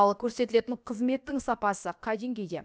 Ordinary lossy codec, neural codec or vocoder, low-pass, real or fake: none; codec, 16 kHz, about 1 kbps, DyCAST, with the encoder's durations; none; fake